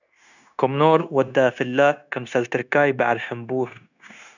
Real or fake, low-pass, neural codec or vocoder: fake; 7.2 kHz; codec, 16 kHz, 0.9 kbps, LongCat-Audio-Codec